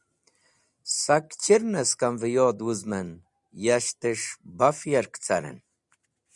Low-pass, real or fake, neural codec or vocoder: 10.8 kHz; real; none